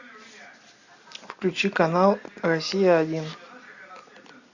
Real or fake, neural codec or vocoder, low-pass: real; none; 7.2 kHz